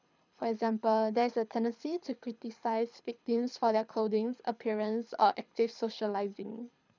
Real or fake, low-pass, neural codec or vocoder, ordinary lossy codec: fake; 7.2 kHz; codec, 24 kHz, 6 kbps, HILCodec; none